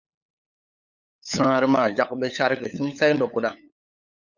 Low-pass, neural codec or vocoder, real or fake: 7.2 kHz; codec, 16 kHz, 8 kbps, FunCodec, trained on LibriTTS, 25 frames a second; fake